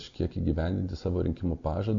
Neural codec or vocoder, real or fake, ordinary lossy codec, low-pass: none; real; AAC, 64 kbps; 7.2 kHz